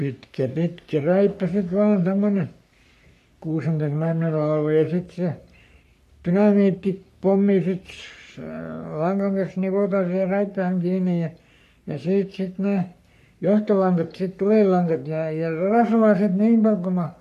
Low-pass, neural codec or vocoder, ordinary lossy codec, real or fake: 14.4 kHz; codec, 44.1 kHz, 3.4 kbps, Pupu-Codec; AAC, 96 kbps; fake